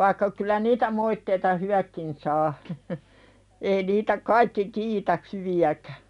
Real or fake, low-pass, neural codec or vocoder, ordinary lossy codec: real; 10.8 kHz; none; none